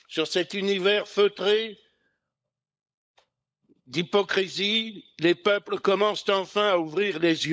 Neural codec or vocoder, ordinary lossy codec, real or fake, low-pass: codec, 16 kHz, 16 kbps, FunCodec, trained on LibriTTS, 50 frames a second; none; fake; none